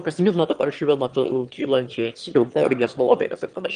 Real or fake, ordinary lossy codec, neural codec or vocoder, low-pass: fake; Opus, 24 kbps; autoencoder, 22.05 kHz, a latent of 192 numbers a frame, VITS, trained on one speaker; 9.9 kHz